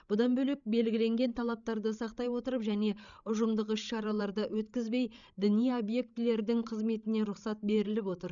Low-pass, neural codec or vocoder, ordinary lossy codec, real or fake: 7.2 kHz; codec, 16 kHz, 16 kbps, FreqCodec, larger model; none; fake